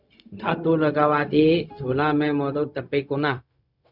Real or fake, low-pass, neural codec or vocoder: fake; 5.4 kHz; codec, 16 kHz, 0.4 kbps, LongCat-Audio-Codec